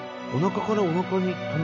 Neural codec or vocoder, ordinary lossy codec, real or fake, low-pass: none; none; real; 7.2 kHz